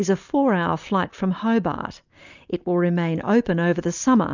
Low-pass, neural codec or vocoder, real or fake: 7.2 kHz; none; real